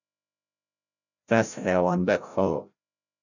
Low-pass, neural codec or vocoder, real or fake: 7.2 kHz; codec, 16 kHz, 0.5 kbps, FreqCodec, larger model; fake